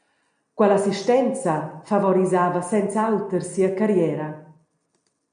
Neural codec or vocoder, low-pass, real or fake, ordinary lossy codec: none; 9.9 kHz; real; AAC, 96 kbps